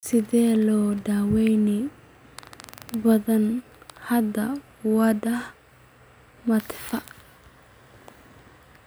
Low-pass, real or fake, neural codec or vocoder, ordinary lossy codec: none; real; none; none